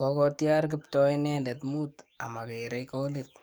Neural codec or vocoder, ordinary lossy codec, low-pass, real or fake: codec, 44.1 kHz, 7.8 kbps, DAC; none; none; fake